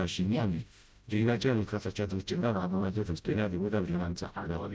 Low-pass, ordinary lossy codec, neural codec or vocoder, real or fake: none; none; codec, 16 kHz, 0.5 kbps, FreqCodec, smaller model; fake